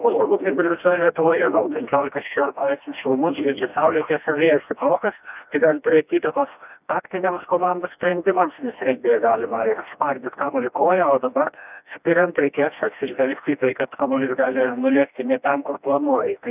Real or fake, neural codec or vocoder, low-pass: fake; codec, 16 kHz, 1 kbps, FreqCodec, smaller model; 3.6 kHz